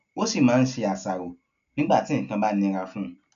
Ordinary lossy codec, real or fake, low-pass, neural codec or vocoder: none; real; 7.2 kHz; none